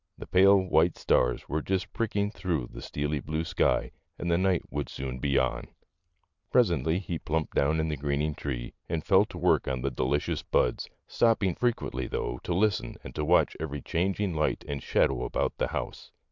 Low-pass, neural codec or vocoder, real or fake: 7.2 kHz; none; real